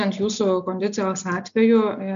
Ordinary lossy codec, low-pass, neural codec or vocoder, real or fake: AAC, 96 kbps; 7.2 kHz; none; real